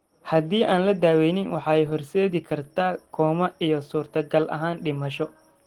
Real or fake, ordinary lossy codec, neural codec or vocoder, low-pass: real; Opus, 16 kbps; none; 19.8 kHz